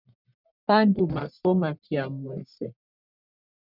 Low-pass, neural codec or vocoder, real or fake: 5.4 kHz; codec, 44.1 kHz, 1.7 kbps, Pupu-Codec; fake